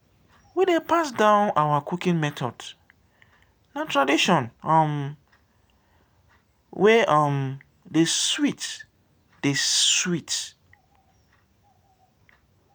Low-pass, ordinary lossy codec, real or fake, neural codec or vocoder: none; none; real; none